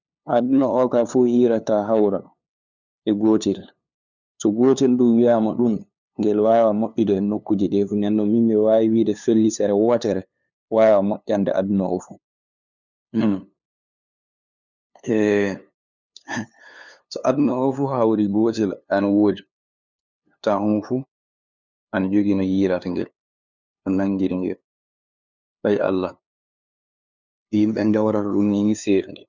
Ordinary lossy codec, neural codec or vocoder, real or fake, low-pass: none; codec, 16 kHz, 2 kbps, FunCodec, trained on LibriTTS, 25 frames a second; fake; 7.2 kHz